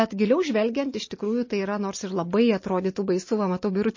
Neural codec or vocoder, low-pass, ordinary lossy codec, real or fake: none; 7.2 kHz; MP3, 32 kbps; real